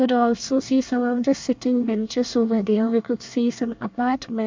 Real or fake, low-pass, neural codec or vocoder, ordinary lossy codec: fake; 7.2 kHz; codec, 24 kHz, 1 kbps, SNAC; MP3, 64 kbps